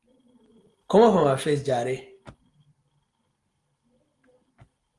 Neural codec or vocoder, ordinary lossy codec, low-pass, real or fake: none; Opus, 24 kbps; 10.8 kHz; real